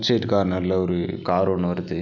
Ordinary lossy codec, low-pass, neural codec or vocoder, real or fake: none; 7.2 kHz; none; real